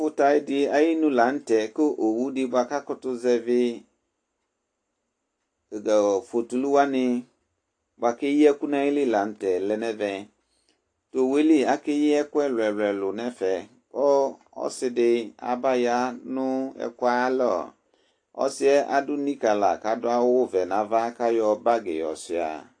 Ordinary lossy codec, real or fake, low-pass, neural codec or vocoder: AAC, 48 kbps; real; 9.9 kHz; none